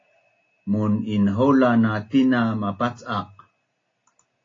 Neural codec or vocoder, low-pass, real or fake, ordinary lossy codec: none; 7.2 kHz; real; AAC, 32 kbps